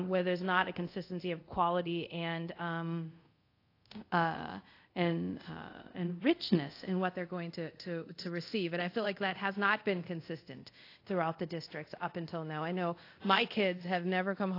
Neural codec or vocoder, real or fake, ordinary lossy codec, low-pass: codec, 24 kHz, 0.5 kbps, DualCodec; fake; AAC, 32 kbps; 5.4 kHz